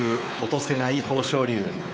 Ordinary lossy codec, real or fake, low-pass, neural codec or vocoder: none; fake; none; codec, 16 kHz, 4 kbps, X-Codec, WavLM features, trained on Multilingual LibriSpeech